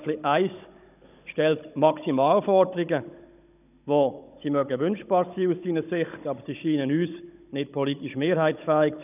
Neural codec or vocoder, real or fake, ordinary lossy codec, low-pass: codec, 16 kHz, 16 kbps, FunCodec, trained on Chinese and English, 50 frames a second; fake; none; 3.6 kHz